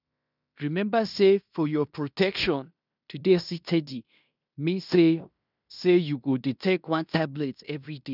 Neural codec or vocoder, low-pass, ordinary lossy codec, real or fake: codec, 16 kHz in and 24 kHz out, 0.9 kbps, LongCat-Audio-Codec, fine tuned four codebook decoder; 5.4 kHz; none; fake